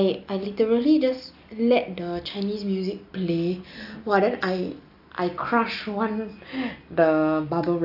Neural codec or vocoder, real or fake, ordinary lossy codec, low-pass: none; real; AAC, 48 kbps; 5.4 kHz